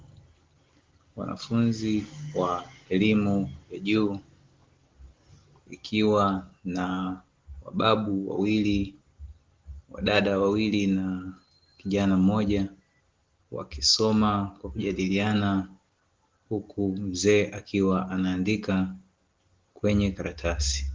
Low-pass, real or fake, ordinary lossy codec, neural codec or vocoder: 7.2 kHz; real; Opus, 16 kbps; none